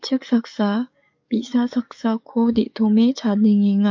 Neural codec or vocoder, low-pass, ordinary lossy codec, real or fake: codec, 44.1 kHz, 7.8 kbps, DAC; 7.2 kHz; MP3, 48 kbps; fake